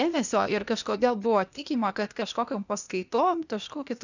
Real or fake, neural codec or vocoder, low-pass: fake; codec, 16 kHz, 0.8 kbps, ZipCodec; 7.2 kHz